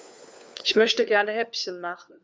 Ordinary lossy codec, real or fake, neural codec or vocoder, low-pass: none; fake; codec, 16 kHz, 4 kbps, FunCodec, trained on LibriTTS, 50 frames a second; none